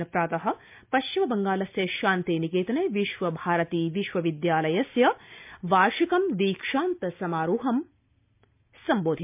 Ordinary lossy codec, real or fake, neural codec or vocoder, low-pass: MP3, 32 kbps; real; none; 3.6 kHz